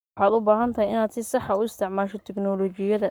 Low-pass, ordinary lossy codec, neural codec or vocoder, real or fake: none; none; codec, 44.1 kHz, 7.8 kbps, Pupu-Codec; fake